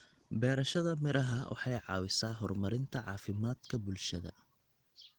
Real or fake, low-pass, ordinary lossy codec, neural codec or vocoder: real; 19.8 kHz; Opus, 16 kbps; none